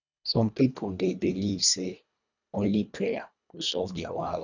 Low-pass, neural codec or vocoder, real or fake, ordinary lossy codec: 7.2 kHz; codec, 24 kHz, 1.5 kbps, HILCodec; fake; none